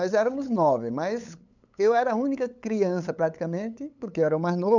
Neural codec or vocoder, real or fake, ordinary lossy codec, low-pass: codec, 16 kHz, 8 kbps, FunCodec, trained on LibriTTS, 25 frames a second; fake; none; 7.2 kHz